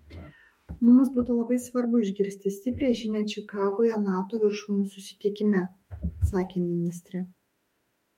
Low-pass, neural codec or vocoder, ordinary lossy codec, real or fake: 19.8 kHz; autoencoder, 48 kHz, 32 numbers a frame, DAC-VAE, trained on Japanese speech; MP3, 64 kbps; fake